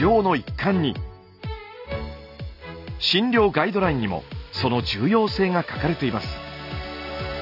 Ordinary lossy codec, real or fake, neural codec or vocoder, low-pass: none; real; none; 5.4 kHz